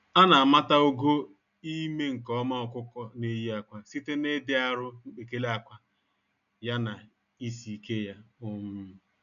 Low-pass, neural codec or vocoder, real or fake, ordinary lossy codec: 7.2 kHz; none; real; none